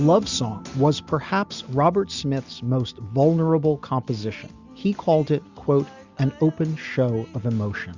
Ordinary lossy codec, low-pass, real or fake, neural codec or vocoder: Opus, 64 kbps; 7.2 kHz; real; none